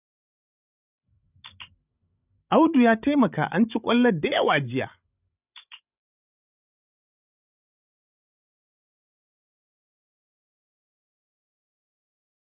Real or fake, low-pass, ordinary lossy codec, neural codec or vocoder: fake; 3.6 kHz; none; codec, 16 kHz, 16 kbps, FreqCodec, larger model